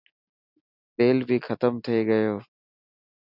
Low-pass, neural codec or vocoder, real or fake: 5.4 kHz; none; real